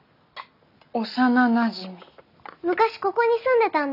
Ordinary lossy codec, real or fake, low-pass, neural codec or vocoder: none; real; 5.4 kHz; none